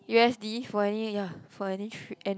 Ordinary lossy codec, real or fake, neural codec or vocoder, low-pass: none; real; none; none